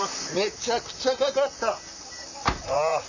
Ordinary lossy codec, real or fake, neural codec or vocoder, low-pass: none; fake; vocoder, 44.1 kHz, 128 mel bands, Pupu-Vocoder; 7.2 kHz